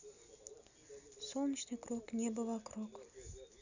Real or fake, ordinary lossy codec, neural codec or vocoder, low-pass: real; none; none; 7.2 kHz